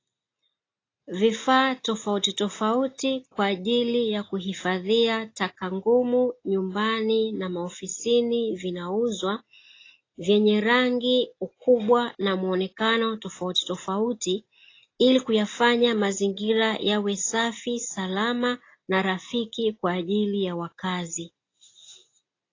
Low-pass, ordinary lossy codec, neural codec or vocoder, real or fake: 7.2 kHz; AAC, 32 kbps; none; real